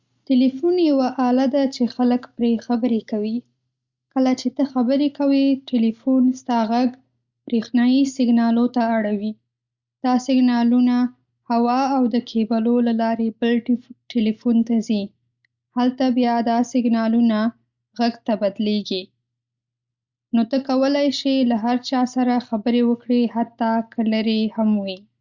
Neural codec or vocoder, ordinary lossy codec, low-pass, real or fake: none; Opus, 64 kbps; 7.2 kHz; real